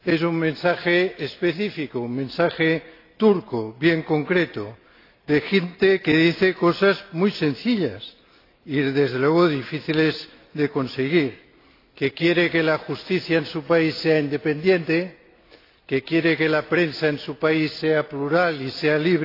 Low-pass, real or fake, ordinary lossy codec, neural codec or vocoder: 5.4 kHz; real; AAC, 32 kbps; none